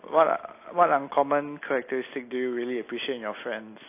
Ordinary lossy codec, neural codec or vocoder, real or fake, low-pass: AAC, 24 kbps; none; real; 3.6 kHz